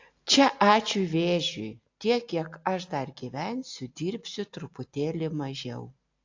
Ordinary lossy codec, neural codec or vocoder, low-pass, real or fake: AAC, 48 kbps; none; 7.2 kHz; real